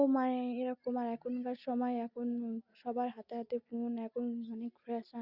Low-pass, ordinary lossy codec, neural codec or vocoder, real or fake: 5.4 kHz; Opus, 64 kbps; none; real